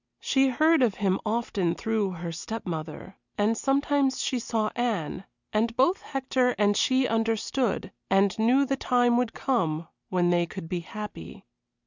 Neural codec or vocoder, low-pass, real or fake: none; 7.2 kHz; real